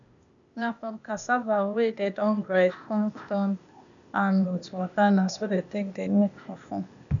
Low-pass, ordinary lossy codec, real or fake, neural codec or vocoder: 7.2 kHz; none; fake; codec, 16 kHz, 0.8 kbps, ZipCodec